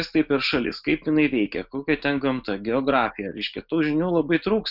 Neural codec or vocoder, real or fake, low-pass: none; real; 5.4 kHz